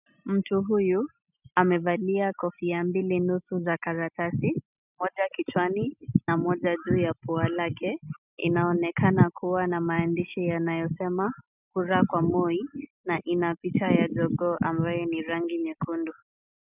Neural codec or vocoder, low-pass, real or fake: none; 3.6 kHz; real